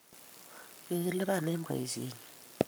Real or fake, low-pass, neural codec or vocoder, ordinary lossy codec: fake; none; codec, 44.1 kHz, 7.8 kbps, Pupu-Codec; none